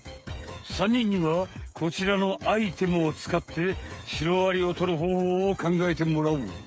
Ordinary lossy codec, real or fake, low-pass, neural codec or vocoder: none; fake; none; codec, 16 kHz, 8 kbps, FreqCodec, smaller model